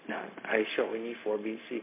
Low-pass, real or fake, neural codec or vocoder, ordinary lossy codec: 3.6 kHz; fake; codec, 16 kHz, 0.4 kbps, LongCat-Audio-Codec; MP3, 24 kbps